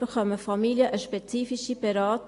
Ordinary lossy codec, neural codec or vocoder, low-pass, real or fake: AAC, 48 kbps; none; 10.8 kHz; real